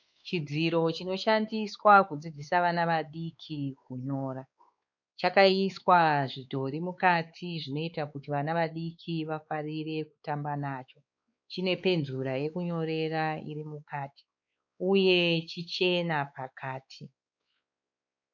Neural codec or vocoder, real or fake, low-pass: codec, 16 kHz, 4 kbps, X-Codec, WavLM features, trained on Multilingual LibriSpeech; fake; 7.2 kHz